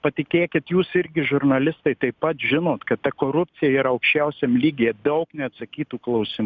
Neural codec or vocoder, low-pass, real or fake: none; 7.2 kHz; real